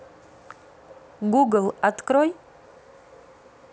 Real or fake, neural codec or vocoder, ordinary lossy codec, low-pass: real; none; none; none